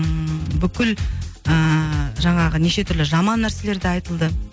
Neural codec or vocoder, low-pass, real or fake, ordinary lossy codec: none; none; real; none